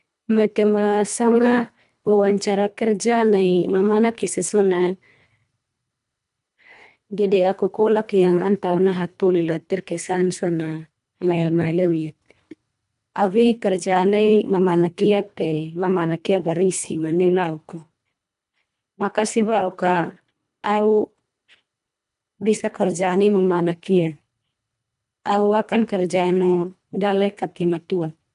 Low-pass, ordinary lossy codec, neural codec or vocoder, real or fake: 10.8 kHz; none; codec, 24 kHz, 1.5 kbps, HILCodec; fake